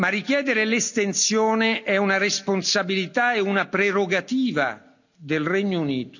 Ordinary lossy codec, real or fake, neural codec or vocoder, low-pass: none; real; none; 7.2 kHz